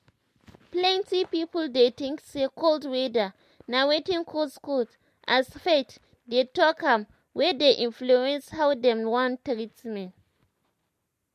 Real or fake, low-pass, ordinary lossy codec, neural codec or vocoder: real; 14.4 kHz; MP3, 64 kbps; none